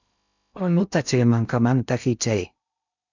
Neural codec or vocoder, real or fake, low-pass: codec, 16 kHz in and 24 kHz out, 0.6 kbps, FocalCodec, streaming, 2048 codes; fake; 7.2 kHz